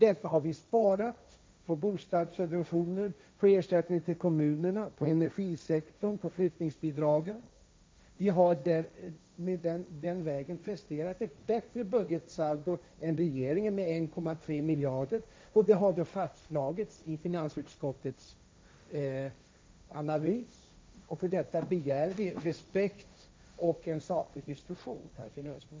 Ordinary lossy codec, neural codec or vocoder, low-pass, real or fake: none; codec, 16 kHz, 1.1 kbps, Voila-Tokenizer; none; fake